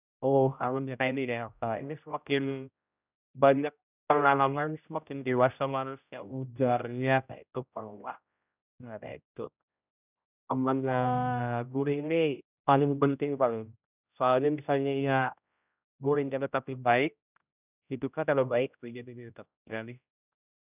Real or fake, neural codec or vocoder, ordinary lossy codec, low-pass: fake; codec, 16 kHz, 0.5 kbps, X-Codec, HuBERT features, trained on general audio; none; 3.6 kHz